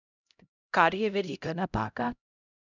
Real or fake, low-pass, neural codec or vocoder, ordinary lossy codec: fake; 7.2 kHz; codec, 16 kHz, 0.5 kbps, X-Codec, HuBERT features, trained on LibriSpeech; none